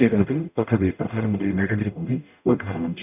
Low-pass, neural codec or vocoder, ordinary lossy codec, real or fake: 3.6 kHz; codec, 44.1 kHz, 0.9 kbps, DAC; none; fake